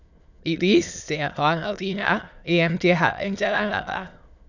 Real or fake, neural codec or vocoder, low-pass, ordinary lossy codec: fake; autoencoder, 22.05 kHz, a latent of 192 numbers a frame, VITS, trained on many speakers; 7.2 kHz; none